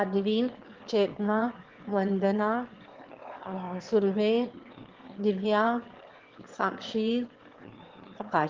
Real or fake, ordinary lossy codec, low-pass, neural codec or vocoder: fake; Opus, 16 kbps; 7.2 kHz; autoencoder, 22.05 kHz, a latent of 192 numbers a frame, VITS, trained on one speaker